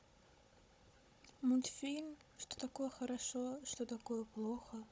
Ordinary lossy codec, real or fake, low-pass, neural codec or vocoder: none; fake; none; codec, 16 kHz, 16 kbps, FunCodec, trained on Chinese and English, 50 frames a second